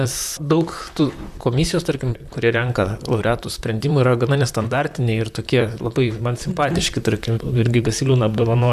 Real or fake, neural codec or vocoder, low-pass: fake; vocoder, 44.1 kHz, 128 mel bands, Pupu-Vocoder; 14.4 kHz